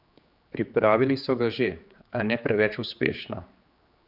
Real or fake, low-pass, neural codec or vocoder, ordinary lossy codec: fake; 5.4 kHz; codec, 16 kHz, 4 kbps, X-Codec, HuBERT features, trained on general audio; Opus, 64 kbps